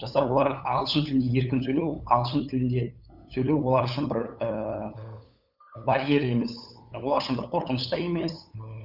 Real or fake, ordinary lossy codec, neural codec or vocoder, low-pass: fake; none; codec, 16 kHz, 8 kbps, FunCodec, trained on LibriTTS, 25 frames a second; 5.4 kHz